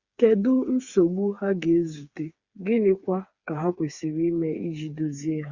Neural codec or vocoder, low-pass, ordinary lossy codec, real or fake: codec, 16 kHz, 4 kbps, FreqCodec, smaller model; 7.2 kHz; Opus, 64 kbps; fake